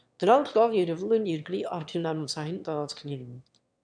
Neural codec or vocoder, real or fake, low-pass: autoencoder, 22.05 kHz, a latent of 192 numbers a frame, VITS, trained on one speaker; fake; 9.9 kHz